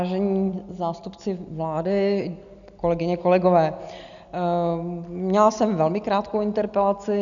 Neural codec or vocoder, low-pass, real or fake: none; 7.2 kHz; real